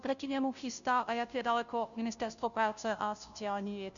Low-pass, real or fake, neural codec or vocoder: 7.2 kHz; fake; codec, 16 kHz, 0.5 kbps, FunCodec, trained on Chinese and English, 25 frames a second